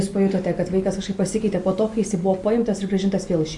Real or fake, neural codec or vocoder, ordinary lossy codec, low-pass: real; none; MP3, 48 kbps; 10.8 kHz